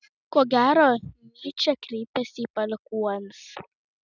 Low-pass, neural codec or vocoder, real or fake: 7.2 kHz; none; real